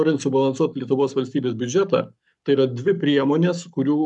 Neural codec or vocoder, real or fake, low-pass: codec, 44.1 kHz, 7.8 kbps, Pupu-Codec; fake; 10.8 kHz